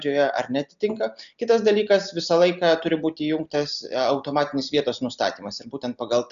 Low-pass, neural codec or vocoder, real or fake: 7.2 kHz; none; real